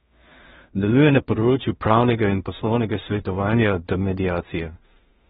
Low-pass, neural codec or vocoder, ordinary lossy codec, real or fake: 10.8 kHz; codec, 16 kHz in and 24 kHz out, 0.4 kbps, LongCat-Audio-Codec, two codebook decoder; AAC, 16 kbps; fake